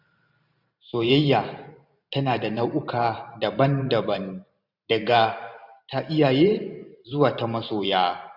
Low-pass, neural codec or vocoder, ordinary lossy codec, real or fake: 5.4 kHz; vocoder, 44.1 kHz, 128 mel bands every 512 samples, BigVGAN v2; none; fake